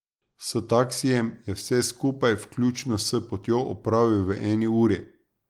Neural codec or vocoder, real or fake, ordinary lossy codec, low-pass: none; real; Opus, 24 kbps; 19.8 kHz